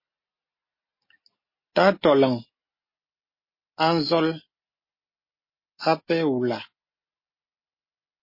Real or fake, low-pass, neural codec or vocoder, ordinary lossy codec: real; 5.4 kHz; none; MP3, 24 kbps